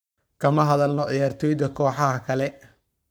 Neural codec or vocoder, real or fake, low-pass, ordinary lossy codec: codec, 44.1 kHz, 7.8 kbps, Pupu-Codec; fake; none; none